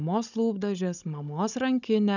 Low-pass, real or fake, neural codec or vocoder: 7.2 kHz; fake; codec, 16 kHz, 16 kbps, FunCodec, trained on Chinese and English, 50 frames a second